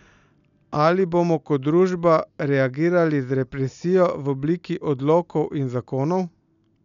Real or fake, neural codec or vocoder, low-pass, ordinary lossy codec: real; none; 7.2 kHz; none